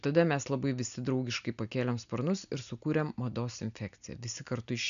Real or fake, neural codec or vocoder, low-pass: real; none; 7.2 kHz